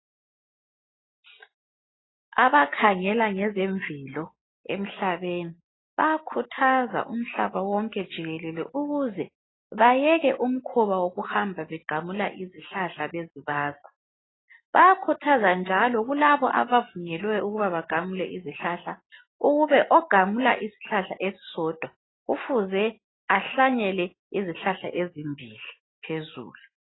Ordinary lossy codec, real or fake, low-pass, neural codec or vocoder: AAC, 16 kbps; real; 7.2 kHz; none